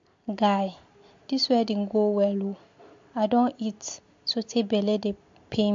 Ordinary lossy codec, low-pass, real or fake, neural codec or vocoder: MP3, 48 kbps; 7.2 kHz; real; none